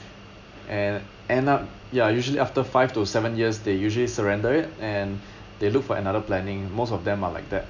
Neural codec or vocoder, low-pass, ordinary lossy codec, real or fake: none; 7.2 kHz; none; real